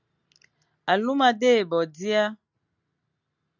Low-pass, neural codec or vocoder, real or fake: 7.2 kHz; none; real